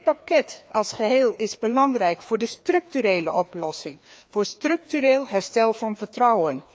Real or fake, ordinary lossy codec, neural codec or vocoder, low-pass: fake; none; codec, 16 kHz, 2 kbps, FreqCodec, larger model; none